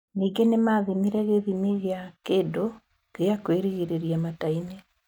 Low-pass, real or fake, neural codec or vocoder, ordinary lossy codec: 19.8 kHz; real; none; none